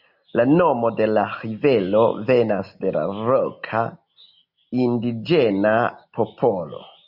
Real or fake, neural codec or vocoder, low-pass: real; none; 5.4 kHz